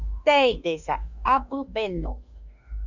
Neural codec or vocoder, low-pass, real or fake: autoencoder, 48 kHz, 32 numbers a frame, DAC-VAE, trained on Japanese speech; 7.2 kHz; fake